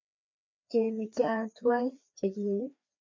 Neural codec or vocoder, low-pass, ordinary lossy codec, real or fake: codec, 16 kHz, 2 kbps, FreqCodec, larger model; 7.2 kHz; MP3, 64 kbps; fake